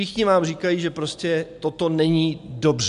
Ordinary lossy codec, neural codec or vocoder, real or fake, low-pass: AAC, 96 kbps; none; real; 10.8 kHz